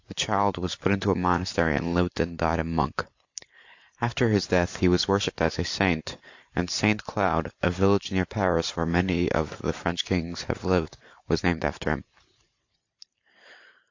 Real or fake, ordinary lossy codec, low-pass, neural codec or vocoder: fake; AAC, 48 kbps; 7.2 kHz; vocoder, 44.1 kHz, 128 mel bands every 512 samples, BigVGAN v2